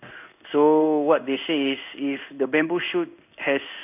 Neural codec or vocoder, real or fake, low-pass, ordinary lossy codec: codec, 16 kHz in and 24 kHz out, 1 kbps, XY-Tokenizer; fake; 3.6 kHz; none